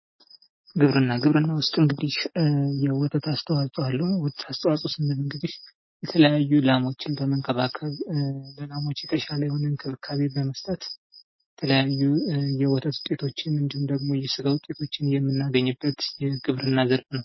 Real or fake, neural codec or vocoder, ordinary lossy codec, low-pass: real; none; MP3, 24 kbps; 7.2 kHz